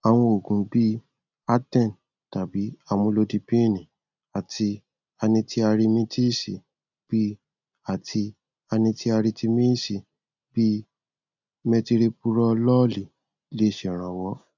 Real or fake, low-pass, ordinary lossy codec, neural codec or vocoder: real; 7.2 kHz; none; none